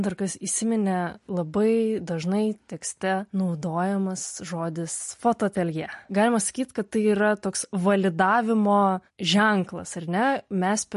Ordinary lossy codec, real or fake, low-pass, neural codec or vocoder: MP3, 48 kbps; real; 14.4 kHz; none